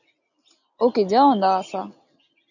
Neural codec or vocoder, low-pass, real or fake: none; 7.2 kHz; real